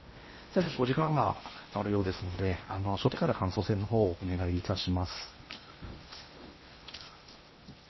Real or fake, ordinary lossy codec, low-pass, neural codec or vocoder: fake; MP3, 24 kbps; 7.2 kHz; codec, 16 kHz in and 24 kHz out, 0.8 kbps, FocalCodec, streaming, 65536 codes